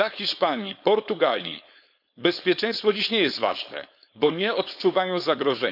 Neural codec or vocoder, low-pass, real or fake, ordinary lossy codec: codec, 16 kHz, 4.8 kbps, FACodec; 5.4 kHz; fake; none